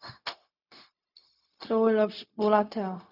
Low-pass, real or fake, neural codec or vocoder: 5.4 kHz; fake; codec, 16 kHz, 0.4 kbps, LongCat-Audio-Codec